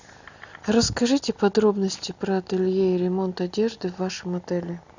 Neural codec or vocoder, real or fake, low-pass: none; real; 7.2 kHz